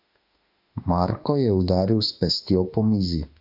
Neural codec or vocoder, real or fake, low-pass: autoencoder, 48 kHz, 32 numbers a frame, DAC-VAE, trained on Japanese speech; fake; 5.4 kHz